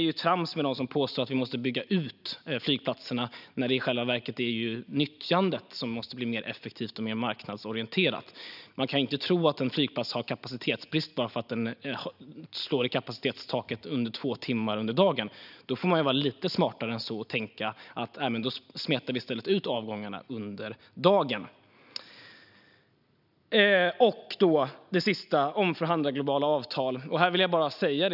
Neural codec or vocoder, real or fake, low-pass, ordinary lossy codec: none; real; 5.4 kHz; none